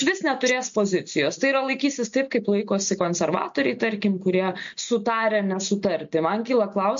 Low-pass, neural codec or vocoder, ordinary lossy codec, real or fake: 7.2 kHz; none; MP3, 48 kbps; real